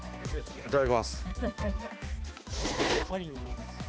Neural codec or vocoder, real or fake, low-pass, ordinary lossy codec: codec, 16 kHz, 2 kbps, X-Codec, HuBERT features, trained on balanced general audio; fake; none; none